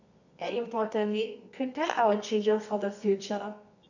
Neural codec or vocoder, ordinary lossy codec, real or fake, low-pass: codec, 24 kHz, 0.9 kbps, WavTokenizer, medium music audio release; none; fake; 7.2 kHz